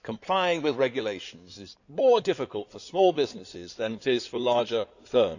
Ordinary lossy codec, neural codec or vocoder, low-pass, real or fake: none; codec, 16 kHz in and 24 kHz out, 2.2 kbps, FireRedTTS-2 codec; 7.2 kHz; fake